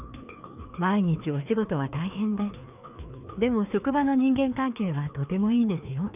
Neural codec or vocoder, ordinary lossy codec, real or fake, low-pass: codec, 16 kHz, 2 kbps, FreqCodec, larger model; none; fake; 3.6 kHz